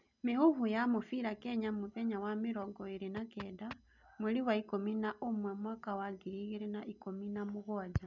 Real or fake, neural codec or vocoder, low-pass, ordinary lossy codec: real; none; 7.2 kHz; none